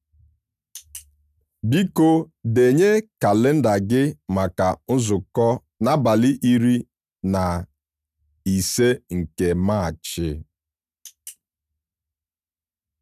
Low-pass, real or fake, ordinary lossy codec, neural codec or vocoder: 14.4 kHz; real; none; none